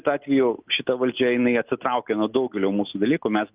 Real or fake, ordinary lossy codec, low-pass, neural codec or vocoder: real; Opus, 24 kbps; 3.6 kHz; none